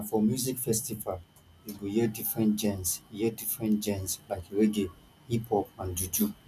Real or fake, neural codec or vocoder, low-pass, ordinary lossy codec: real; none; 14.4 kHz; none